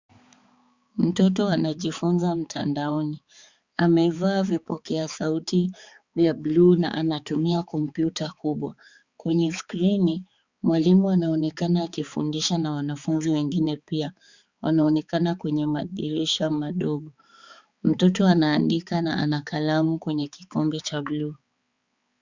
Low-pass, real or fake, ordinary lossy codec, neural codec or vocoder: 7.2 kHz; fake; Opus, 64 kbps; codec, 16 kHz, 4 kbps, X-Codec, HuBERT features, trained on balanced general audio